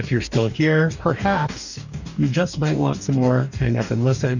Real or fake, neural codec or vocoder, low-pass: fake; codec, 44.1 kHz, 2.6 kbps, DAC; 7.2 kHz